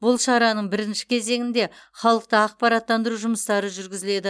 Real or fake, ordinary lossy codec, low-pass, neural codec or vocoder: real; none; none; none